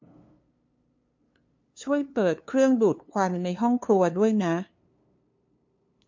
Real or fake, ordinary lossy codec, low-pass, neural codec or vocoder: fake; MP3, 48 kbps; 7.2 kHz; codec, 16 kHz, 2 kbps, FunCodec, trained on LibriTTS, 25 frames a second